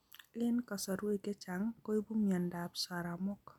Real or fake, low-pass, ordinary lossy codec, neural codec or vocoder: real; 19.8 kHz; none; none